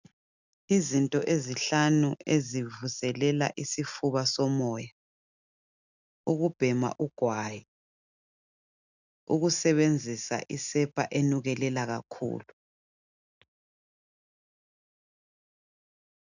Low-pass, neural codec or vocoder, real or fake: 7.2 kHz; none; real